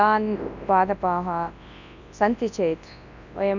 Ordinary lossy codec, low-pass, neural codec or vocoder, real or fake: none; 7.2 kHz; codec, 24 kHz, 0.9 kbps, WavTokenizer, large speech release; fake